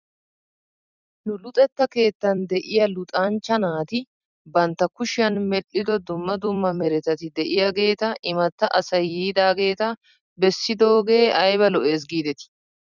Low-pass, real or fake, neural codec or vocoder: 7.2 kHz; fake; vocoder, 44.1 kHz, 128 mel bands every 256 samples, BigVGAN v2